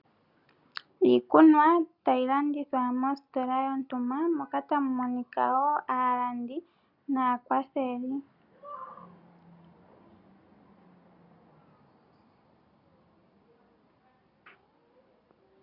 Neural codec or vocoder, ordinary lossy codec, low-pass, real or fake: none; Opus, 64 kbps; 5.4 kHz; real